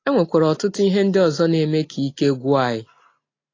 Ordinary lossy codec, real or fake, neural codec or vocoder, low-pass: AAC, 32 kbps; real; none; 7.2 kHz